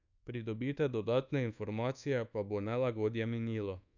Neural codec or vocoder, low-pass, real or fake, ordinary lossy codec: codec, 24 kHz, 1.2 kbps, DualCodec; 7.2 kHz; fake; none